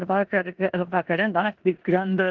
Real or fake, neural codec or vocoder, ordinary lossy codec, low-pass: fake; codec, 16 kHz in and 24 kHz out, 0.9 kbps, LongCat-Audio-Codec, four codebook decoder; Opus, 16 kbps; 7.2 kHz